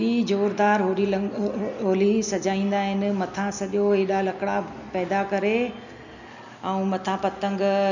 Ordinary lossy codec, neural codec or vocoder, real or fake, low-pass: none; none; real; 7.2 kHz